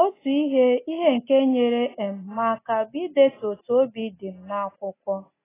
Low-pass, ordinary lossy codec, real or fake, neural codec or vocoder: 3.6 kHz; AAC, 16 kbps; real; none